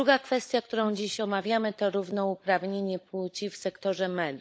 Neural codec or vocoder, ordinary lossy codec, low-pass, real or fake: codec, 16 kHz, 16 kbps, FunCodec, trained on LibriTTS, 50 frames a second; none; none; fake